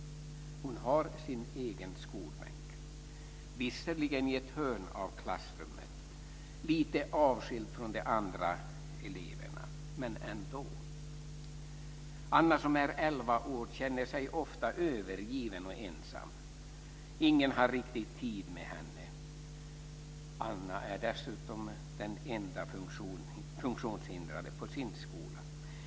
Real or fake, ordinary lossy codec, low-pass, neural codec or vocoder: real; none; none; none